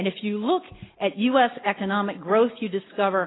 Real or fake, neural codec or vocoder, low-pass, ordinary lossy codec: real; none; 7.2 kHz; AAC, 16 kbps